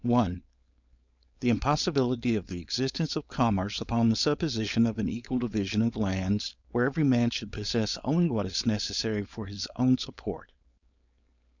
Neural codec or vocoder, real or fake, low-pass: codec, 16 kHz, 4.8 kbps, FACodec; fake; 7.2 kHz